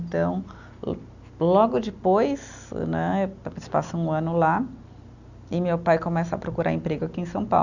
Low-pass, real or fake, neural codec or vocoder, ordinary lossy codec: 7.2 kHz; real; none; none